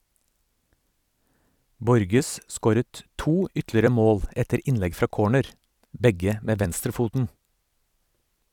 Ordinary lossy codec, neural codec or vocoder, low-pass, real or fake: none; vocoder, 44.1 kHz, 128 mel bands every 256 samples, BigVGAN v2; 19.8 kHz; fake